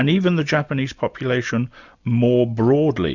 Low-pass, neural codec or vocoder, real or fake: 7.2 kHz; none; real